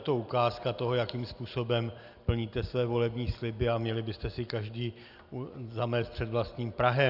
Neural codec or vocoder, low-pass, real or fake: none; 5.4 kHz; real